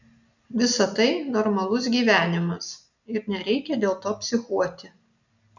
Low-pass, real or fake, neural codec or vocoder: 7.2 kHz; real; none